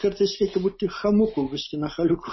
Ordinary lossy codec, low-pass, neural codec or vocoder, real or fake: MP3, 24 kbps; 7.2 kHz; none; real